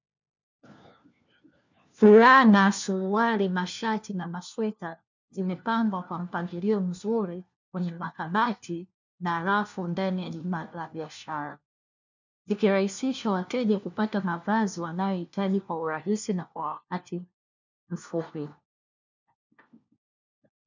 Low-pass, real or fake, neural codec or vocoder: 7.2 kHz; fake; codec, 16 kHz, 1 kbps, FunCodec, trained on LibriTTS, 50 frames a second